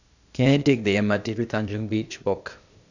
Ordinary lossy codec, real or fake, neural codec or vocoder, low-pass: none; fake; codec, 16 kHz, 0.8 kbps, ZipCodec; 7.2 kHz